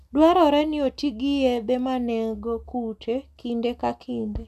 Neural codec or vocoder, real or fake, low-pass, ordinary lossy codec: none; real; 14.4 kHz; none